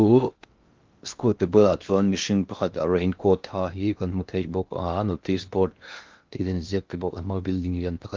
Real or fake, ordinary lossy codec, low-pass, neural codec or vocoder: fake; Opus, 32 kbps; 7.2 kHz; codec, 16 kHz in and 24 kHz out, 0.6 kbps, FocalCodec, streaming, 2048 codes